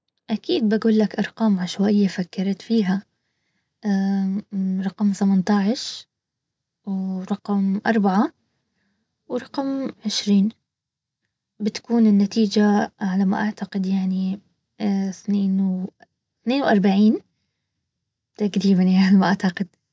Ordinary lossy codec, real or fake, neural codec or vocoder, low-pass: none; real; none; none